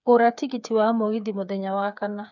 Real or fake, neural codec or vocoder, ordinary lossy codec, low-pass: fake; codec, 16 kHz, 8 kbps, FreqCodec, smaller model; none; 7.2 kHz